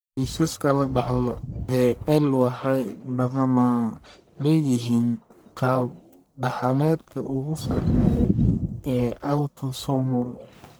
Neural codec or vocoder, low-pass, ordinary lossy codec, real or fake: codec, 44.1 kHz, 1.7 kbps, Pupu-Codec; none; none; fake